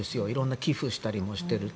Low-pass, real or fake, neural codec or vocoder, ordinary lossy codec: none; real; none; none